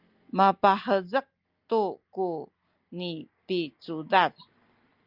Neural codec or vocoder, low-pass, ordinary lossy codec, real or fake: none; 5.4 kHz; Opus, 24 kbps; real